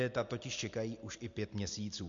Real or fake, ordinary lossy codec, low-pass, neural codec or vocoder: real; MP3, 48 kbps; 7.2 kHz; none